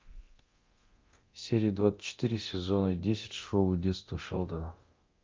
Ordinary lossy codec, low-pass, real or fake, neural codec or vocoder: Opus, 32 kbps; 7.2 kHz; fake; codec, 24 kHz, 0.9 kbps, DualCodec